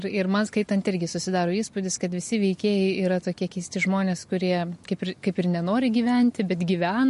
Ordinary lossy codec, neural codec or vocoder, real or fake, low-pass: MP3, 48 kbps; none; real; 14.4 kHz